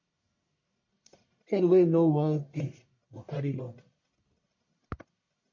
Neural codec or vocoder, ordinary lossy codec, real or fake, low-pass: codec, 44.1 kHz, 1.7 kbps, Pupu-Codec; MP3, 32 kbps; fake; 7.2 kHz